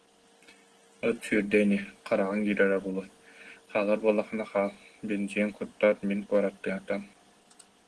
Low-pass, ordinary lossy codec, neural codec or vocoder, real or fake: 10.8 kHz; Opus, 16 kbps; none; real